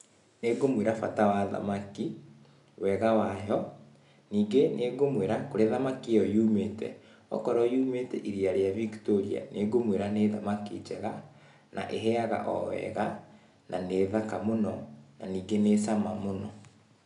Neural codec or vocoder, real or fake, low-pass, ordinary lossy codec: none; real; 10.8 kHz; none